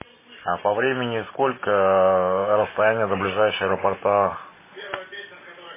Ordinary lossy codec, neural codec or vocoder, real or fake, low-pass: MP3, 16 kbps; none; real; 3.6 kHz